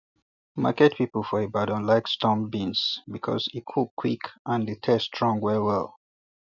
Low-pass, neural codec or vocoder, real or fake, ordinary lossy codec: 7.2 kHz; none; real; none